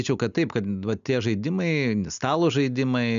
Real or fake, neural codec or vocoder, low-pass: real; none; 7.2 kHz